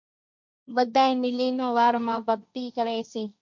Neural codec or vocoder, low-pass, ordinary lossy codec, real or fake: codec, 16 kHz, 1.1 kbps, Voila-Tokenizer; 7.2 kHz; MP3, 64 kbps; fake